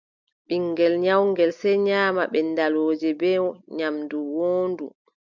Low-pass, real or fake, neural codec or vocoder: 7.2 kHz; real; none